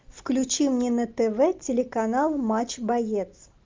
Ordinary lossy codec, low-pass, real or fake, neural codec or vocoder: Opus, 32 kbps; 7.2 kHz; real; none